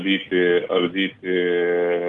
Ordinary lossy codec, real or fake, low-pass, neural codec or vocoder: Opus, 32 kbps; real; 10.8 kHz; none